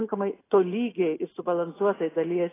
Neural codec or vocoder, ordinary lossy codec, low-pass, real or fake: none; AAC, 16 kbps; 3.6 kHz; real